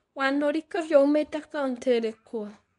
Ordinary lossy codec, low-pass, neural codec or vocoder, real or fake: MP3, 64 kbps; 10.8 kHz; codec, 24 kHz, 0.9 kbps, WavTokenizer, medium speech release version 2; fake